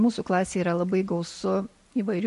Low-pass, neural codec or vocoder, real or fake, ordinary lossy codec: 14.4 kHz; none; real; MP3, 48 kbps